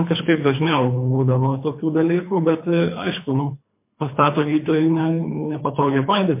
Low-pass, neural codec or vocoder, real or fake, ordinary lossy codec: 3.6 kHz; codec, 24 kHz, 3 kbps, HILCodec; fake; MP3, 24 kbps